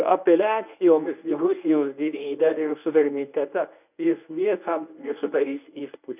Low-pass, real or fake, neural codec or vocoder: 3.6 kHz; fake; codec, 24 kHz, 0.9 kbps, WavTokenizer, medium speech release version 1